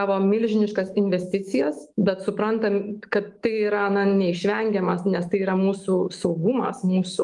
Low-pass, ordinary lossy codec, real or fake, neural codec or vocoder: 10.8 kHz; Opus, 24 kbps; fake; autoencoder, 48 kHz, 128 numbers a frame, DAC-VAE, trained on Japanese speech